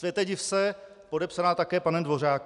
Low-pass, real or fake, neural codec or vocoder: 10.8 kHz; real; none